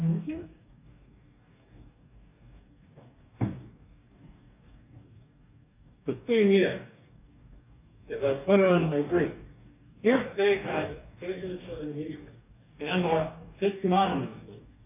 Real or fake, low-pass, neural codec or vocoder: fake; 3.6 kHz; codec, 44.1 kHz, 2.6 kbps, DAC